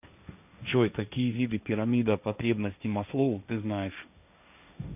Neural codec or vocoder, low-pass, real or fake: codec, 16 kHz, 1.1 kbps, Voila-Tokenizer; 3.6 kHz; fake